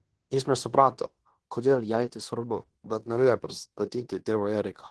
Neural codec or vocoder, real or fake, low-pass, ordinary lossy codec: codec, 16 kHz in and 24 kHz out, 0.9 kbps, LongCat-Audio-Codec, fine tuned four codebook decoder; fake; 10.8 kHz; Opus, 16 kbps